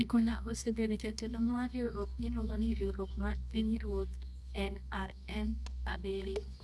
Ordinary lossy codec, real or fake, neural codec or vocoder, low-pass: none; fake; codec, 24 kHz, 0.9 kbps, WavTokenizer, medium music audio release; none